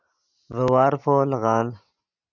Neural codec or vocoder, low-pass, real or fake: none; 7.2 kHz; real